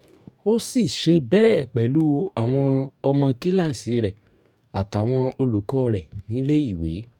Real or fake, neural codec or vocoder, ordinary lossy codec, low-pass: fake; codec, 44.1 kHz, 2.6 kbps, DAC; none; 19.8 kHz